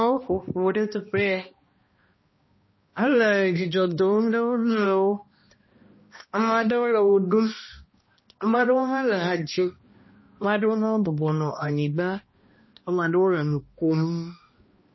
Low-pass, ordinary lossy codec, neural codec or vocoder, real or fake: 7.2 kHz; MP3, 24 kbps; codec, 16 kHz, 1 kbps, X-Codec, HuBERT features, trained on balanced general audio; fake